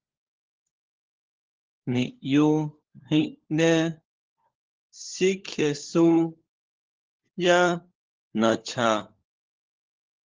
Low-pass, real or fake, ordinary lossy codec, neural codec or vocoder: 7.2 kHz; fake; Opus, 16 kbps; codec, 16 kHz, 4 kbps, FunCodec, trained on LibriTTS, 50 frames a second